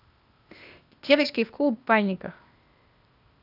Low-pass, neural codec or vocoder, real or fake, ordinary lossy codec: 5.4 kHz; codec, 16 kHz, 0.8 kbps, ZipCodec; fake; none